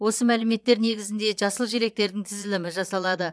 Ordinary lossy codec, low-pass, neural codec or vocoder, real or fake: none; none; vocoder, 22.05 kHz, 80 mel bands, Vocos; fake